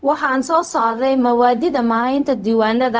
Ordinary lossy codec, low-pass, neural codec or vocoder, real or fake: none; none; codec, 16 kHz, 0.4 kbps, LongCat-Audio-Codec; fake